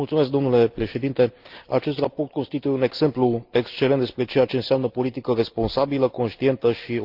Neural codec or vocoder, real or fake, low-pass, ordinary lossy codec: none; real; 5.4 kHz; Opus, 32 kbps